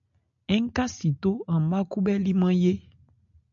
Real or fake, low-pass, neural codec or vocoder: real; 7.2 kHz; none